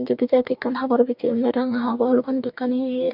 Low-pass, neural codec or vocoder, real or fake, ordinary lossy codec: 5.4 kHz; codec, 44.1 kHz, 2.6 kbps, DAC; fake; none